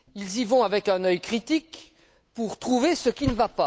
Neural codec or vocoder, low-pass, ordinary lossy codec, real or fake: codec, 16 kHz, 8 kbps, FunCodec, trained on Chinese and English, 25 frames a second; none; none; fake